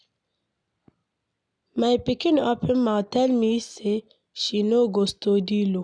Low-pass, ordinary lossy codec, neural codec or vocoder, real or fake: 9.9 kHz; none; none; real